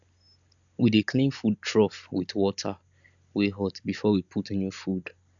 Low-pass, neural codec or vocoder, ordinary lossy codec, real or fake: 7.2 kHz; none; none; real